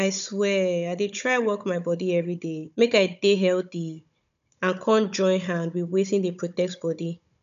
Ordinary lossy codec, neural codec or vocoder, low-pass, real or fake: none; codec, 16 kHz, 16 kbps, FunCodec, trained on Chinese and English, 50 frames a second; 7.2 kHz; fake